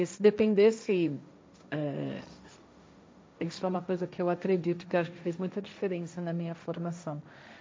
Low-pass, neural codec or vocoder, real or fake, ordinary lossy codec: none; codec, 16 kHz, 1.1 kbps, Voila-Tokenizer; fake; none